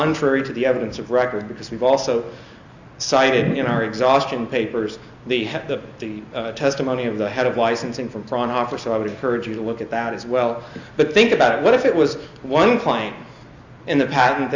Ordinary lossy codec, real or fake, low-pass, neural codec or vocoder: Opus, 64 kbps; real; 7.2 kHz; none